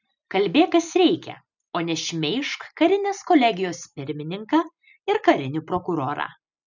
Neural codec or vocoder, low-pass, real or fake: none; 7.2 kHz; real